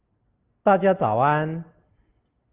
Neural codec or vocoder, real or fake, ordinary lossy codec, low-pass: none; real; Opus, 32 kbps; 3.6 kHz